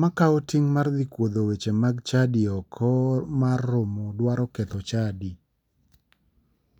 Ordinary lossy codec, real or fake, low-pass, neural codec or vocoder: none; real; 19.8 kHz; none